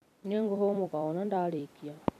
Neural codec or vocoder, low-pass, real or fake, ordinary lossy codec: vocoder, 44.1 kHz, 128 mel bands every 256 samples, BigVGAN v2; 14.4 kHz; fake; none